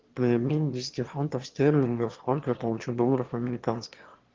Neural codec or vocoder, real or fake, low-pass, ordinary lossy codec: autoencoder, 22.05 kHz, a latent of 192 numbers a frame, VITS, trained on one speaker; fake; 7.2 kHz; Opus, 16 kbps